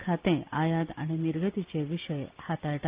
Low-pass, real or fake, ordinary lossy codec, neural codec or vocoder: 3.6 kHz; real; Opus, 32 kbps; none